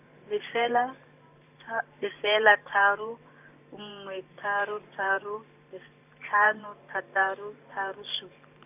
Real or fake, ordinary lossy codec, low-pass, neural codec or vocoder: fake; none; 3.6 kHz; vocoder, 44.1 kHz, 128 mel bands every 512 samples, BigVGAN v2